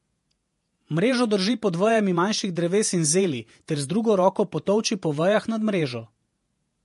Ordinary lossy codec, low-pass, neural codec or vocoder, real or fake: MP3, 48 kbps; 14.4 kHz; vocoder, 48 kHz, 128 mel bands, Vocos; fake